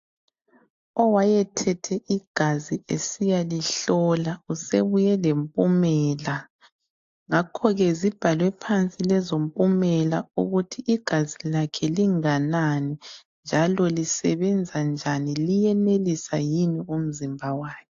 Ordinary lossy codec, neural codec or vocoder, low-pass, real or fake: AAC, 48 kbps; none; 7.2 kHz; real